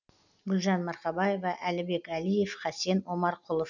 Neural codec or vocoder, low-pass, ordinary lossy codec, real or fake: none; 7.2 kHz; none; real